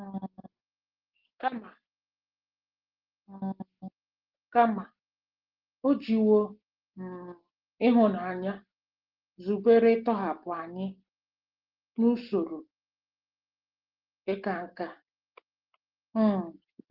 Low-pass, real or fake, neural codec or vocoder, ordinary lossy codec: 5.4 kHz; real; none; Opus, 16 kbps